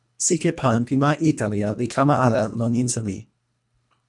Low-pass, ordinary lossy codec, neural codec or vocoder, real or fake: 10.8 kHz; MP3, 96 kbps; codec, 24 kHz, 1.5 kbps, HILCodec; fake